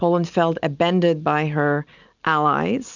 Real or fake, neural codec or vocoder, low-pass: real; none; 7.2 kHz